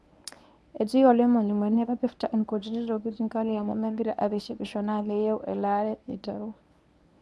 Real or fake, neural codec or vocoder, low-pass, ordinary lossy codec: fake; codec, 24 kHz, 0.9 kbps, WavTokenizer, medium speech release version 1; none; none